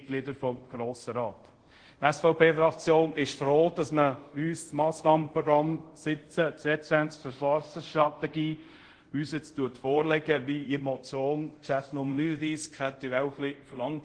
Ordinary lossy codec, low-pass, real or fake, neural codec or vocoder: Opus, 16 kbps; 9.9 kHz; fake; codec, 24 kHz, 0.5 kbps, DualCodec